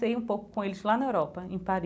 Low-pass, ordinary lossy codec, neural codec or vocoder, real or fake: none; none; none; real